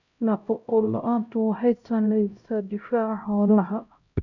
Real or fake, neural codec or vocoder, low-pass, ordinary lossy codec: fake; codec, 16 kHz, 0.5 kbps, X-Codec, HuBERT features, trained on LibriSpeech; 7.2 kHz; none